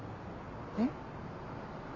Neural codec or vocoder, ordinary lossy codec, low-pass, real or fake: none; MP3, 48 kbps; 7.2 kHz; real